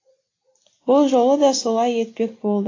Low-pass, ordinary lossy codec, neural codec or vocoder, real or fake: 7.2 kHz; AAC, 32 kbps; none; real